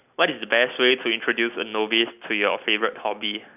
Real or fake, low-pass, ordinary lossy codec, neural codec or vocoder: real; 3.6 kHz; none; none